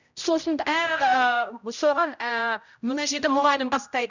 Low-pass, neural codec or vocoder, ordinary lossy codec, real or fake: 7.2 kHz; codec, 16 kHz, 0.5 kbps, X-Codec, HuBERT features, trained on general audio; none; fake